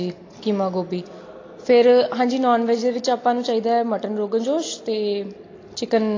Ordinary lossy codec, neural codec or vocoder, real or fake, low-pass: AAC, 32 kbps; none; real; 7.2 kHz